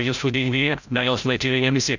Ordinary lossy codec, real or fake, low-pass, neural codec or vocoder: none; fake; 7.2 kHz; codec, 16 kHz, 0.5 kbps, FreqCodec, larger model